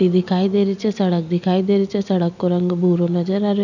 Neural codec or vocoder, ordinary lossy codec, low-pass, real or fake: none; none; 7.2 kHz; real